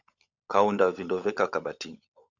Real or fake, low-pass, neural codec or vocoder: fake; 7.2 kHz; codec, 16 kHz, 16 kbps, FunCodec, trained on LibriTTS, 50 frames a second